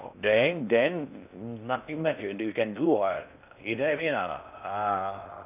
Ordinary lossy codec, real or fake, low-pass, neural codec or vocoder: none; fake; 3.6 kHz; codec, 16 kHz in and 24 kHz out, 0.6 kbps, FocalCodec, streaming, 4096 codes